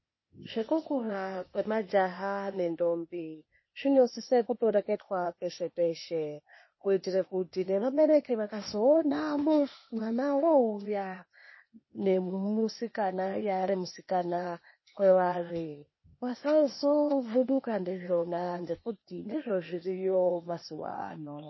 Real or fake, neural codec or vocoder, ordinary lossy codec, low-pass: fake; codec, 16 kHz, 0.8 kbps, ZipCodec; MP3, 24 kbps; 7.2 kHz